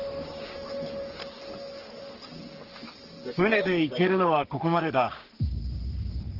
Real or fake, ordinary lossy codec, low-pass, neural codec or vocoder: fake; Opus, 16 kbps; 5.4 kHz; codec, 44.1 kHz, 3.4 kbps, Pupu-Codec